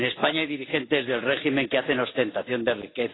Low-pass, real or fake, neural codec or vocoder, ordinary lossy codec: 7.2 kHz; real; none; AAC, 16 kbps